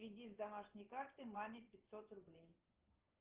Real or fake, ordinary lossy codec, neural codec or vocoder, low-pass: fake; Opus, 16 kbps; vocoder, 24 kHz, 100 mel bands, Vocos; 3.6 kHz